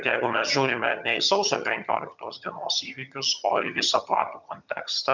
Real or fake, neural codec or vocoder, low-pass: fake; vocoder, 22.05 kHz, 80 mel bands, HiFi-GAN; 7.2 kHz